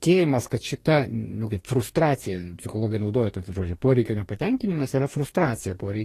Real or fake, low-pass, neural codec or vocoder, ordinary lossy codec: fake; 14.4 kHz; codec, 44.1 kHz, 2.6 kbps, DAC; AAC, 48 kbps